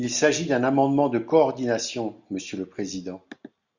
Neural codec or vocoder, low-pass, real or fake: none; 7.2 kHz; real